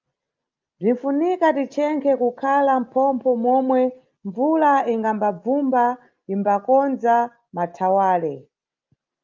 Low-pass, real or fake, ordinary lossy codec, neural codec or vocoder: 7.2 kHz; real; Opus, 24 kbps; none